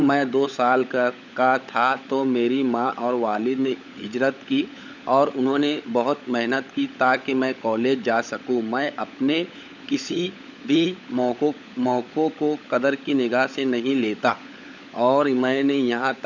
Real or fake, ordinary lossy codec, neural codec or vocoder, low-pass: fake; none; codec, 16 kHz, 16 kbps, FunCodec, trained on LibriTTS, 50 frames a second; 7.2 kHz